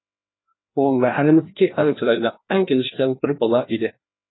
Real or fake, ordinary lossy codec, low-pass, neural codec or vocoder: fake; AAC, 16 kbps; 7.2 kHz; codec, 16 kHz, 1 kbps, FreqCodec, larger model